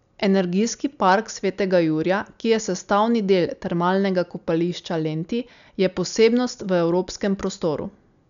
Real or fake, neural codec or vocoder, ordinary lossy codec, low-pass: real; none; none; 7.2 kHz